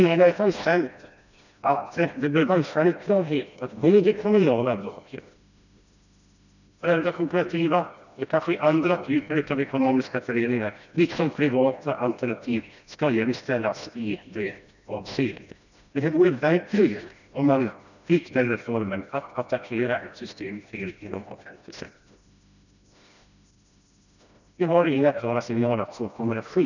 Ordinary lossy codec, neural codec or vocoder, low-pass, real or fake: none; codec, 16 kHz, 1 kbps, FreqCodec, smaller model; 7.2 kHz; fake